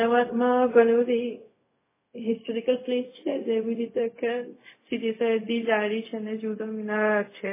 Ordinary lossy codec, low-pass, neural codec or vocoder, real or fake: MP3, 16 kbps; 3.6 kHz; codec, 16 kHz, 0.4 kbps, LongCat-Audio-Codec; fake